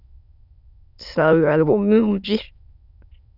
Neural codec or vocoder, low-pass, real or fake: autoencoder, 22.05 kHz, a latent of 192 numbers a frame, VITS, trained on many speakers; 5.4 kHz; fake